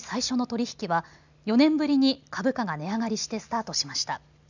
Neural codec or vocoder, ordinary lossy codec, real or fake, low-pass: none; none; real; 7.2 kHz